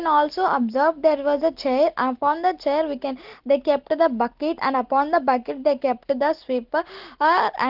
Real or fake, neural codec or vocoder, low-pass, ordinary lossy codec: real; none; 5.4 kHz; Opus, 16 kbps